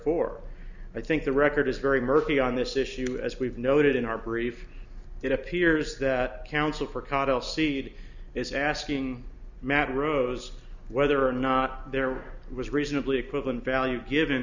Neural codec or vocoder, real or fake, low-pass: none; real; 7.2 kHz